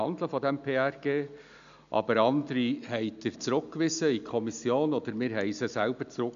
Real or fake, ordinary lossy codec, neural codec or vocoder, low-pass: real; none; none; 7.2 kHz